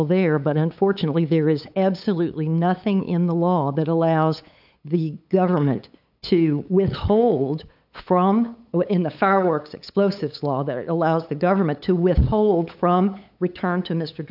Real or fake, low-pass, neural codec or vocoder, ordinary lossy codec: fake; 5.4 kHz; codec, 16 kHz, 8 kbps, FunCodec, trained on LibriTTS, 25 frames a second; AAC, 48 kbps